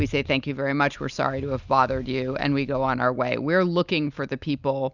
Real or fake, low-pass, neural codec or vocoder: real; 7.2 kHz; none